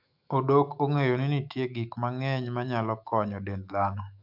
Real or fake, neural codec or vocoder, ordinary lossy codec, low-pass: fake; autoencoder, 48 kHz, 128 numbers a frame, DAC-VAE, trained on Japanese speech; MP3, 48 kbps; 5.4 kHz